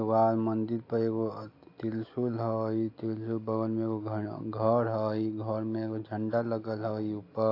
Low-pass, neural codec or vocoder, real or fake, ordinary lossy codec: 5.4 kHz; none; real; AAC, 32 kbps